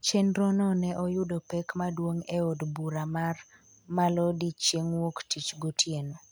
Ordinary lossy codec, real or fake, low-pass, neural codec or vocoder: none; real; none; none